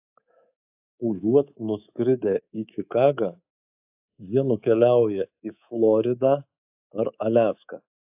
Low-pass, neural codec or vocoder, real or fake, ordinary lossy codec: 3.6 kHz; codec, 24 kHz, 3.1 kbps, DualCodec; fake; AAC, 32 kbps